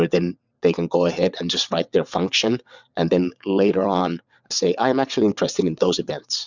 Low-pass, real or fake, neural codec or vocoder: 7.2 kHz; fake; vocoder, 22.05 kHz, 80 mel bands, WaveNeXt